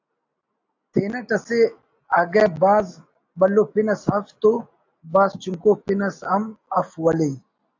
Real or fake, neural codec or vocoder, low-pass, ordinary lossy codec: real; none; 7.2 kHz; AAC, 48 kbps